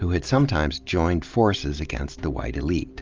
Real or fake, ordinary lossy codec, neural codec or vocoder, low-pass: real; Opus, 24 kbps; none; 7.2 kHz